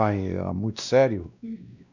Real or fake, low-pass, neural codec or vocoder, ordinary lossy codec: fake; 7.2 kHz; codec, 16 kHz, 1 kbps, X-Codec, WavLM features, trained on Multilingual LibriSpeech; none